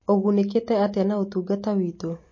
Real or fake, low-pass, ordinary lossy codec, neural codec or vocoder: real; 7.2 kHz; MP3, 32 kbps; none